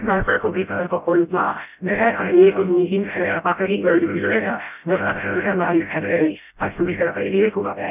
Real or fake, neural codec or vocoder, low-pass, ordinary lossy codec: fake; codec, 16 kHz, 0.5 kbps, FreqCodec, smaller model; 3.6 kHz; none